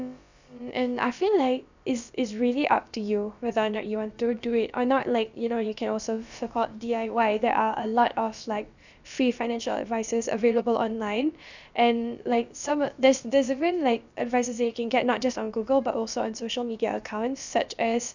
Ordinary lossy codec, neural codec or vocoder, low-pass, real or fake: none; codec, 16 kHz, about 1 kbps, DyCAST, with the encoder's durations; 7.2 kHz; fake